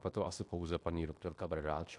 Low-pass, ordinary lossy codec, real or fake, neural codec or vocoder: 10.8 kHz; AAC, 64 kbps; fake; codec, 16 kHz in and 24 kHz out, 0.9 kbps, LongCat-Audio-Codec, fine tuned four codebook decoder